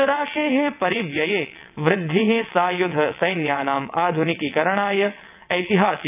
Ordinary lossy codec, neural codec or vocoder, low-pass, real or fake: none; vocoder, 22.05 kHz, 80 mel bands, WaveNeXt; 3.6 kHz; fake